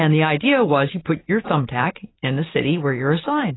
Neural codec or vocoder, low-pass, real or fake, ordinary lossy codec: none; 7.2 kHz; real; AAC, 16 kbps